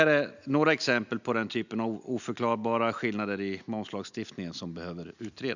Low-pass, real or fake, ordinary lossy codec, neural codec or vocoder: 7.2 kHz; real; none; none